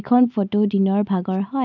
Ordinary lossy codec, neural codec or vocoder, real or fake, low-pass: none; none; real; 7.2 kHz